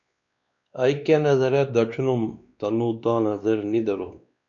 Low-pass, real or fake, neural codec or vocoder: 7.2 kHz; fake; codec, 16 kHz, 2 kbps, X-Codec, WavLM features, trained on Multilingual LibriSpeech